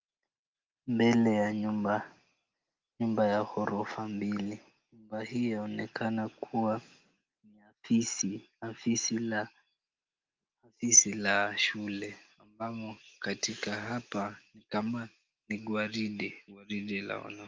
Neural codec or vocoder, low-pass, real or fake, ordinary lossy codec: none; 7.2 kHz; real; Opus, 24 kbps